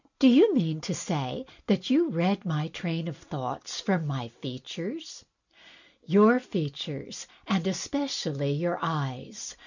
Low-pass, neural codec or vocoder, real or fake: 7.2 kHz; none; real